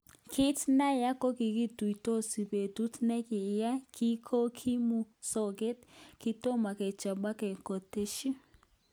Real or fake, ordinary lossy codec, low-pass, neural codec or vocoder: real; none; none; none